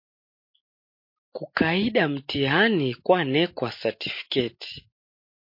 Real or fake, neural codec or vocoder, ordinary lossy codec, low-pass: real; none; MP3, 32 kbps; 5.4 kHz